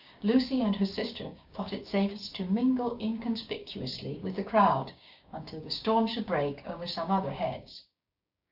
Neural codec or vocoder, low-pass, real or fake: codec, 16 kHz, 6 kbps, DAC; 5.4 kHz; fake